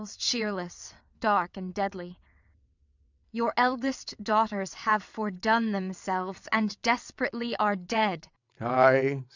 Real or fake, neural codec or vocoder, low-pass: fake; vocoder, 22.05 kHz, 80 mel bands, WaveNeXt; 7.2 kHz